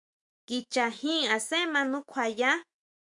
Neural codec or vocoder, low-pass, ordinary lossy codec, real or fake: autoencoder, 48 kHz, 128 numbers a frame, DAC-VAE, trained on Japanese speech; 10.8 kHz; Opus, 64 kbps; fake